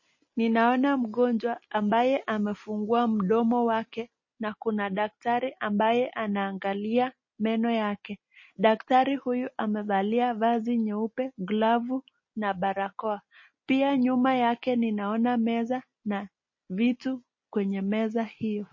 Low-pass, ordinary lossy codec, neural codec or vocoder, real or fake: 7.2 kHz; MP3, 32 kbps; none; real